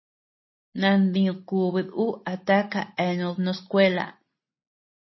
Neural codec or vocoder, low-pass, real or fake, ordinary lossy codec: none; 7.2 kHz; real; MP3, 24 kbps